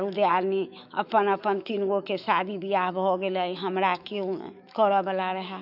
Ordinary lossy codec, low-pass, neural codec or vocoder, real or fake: none; 5.4 kHz; autoencoder, 48 kHz, 128 numbers a frame, DAC-VAE, trained on Japanese speech; fake